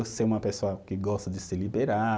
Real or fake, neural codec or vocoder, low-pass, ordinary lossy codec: real; none; none; none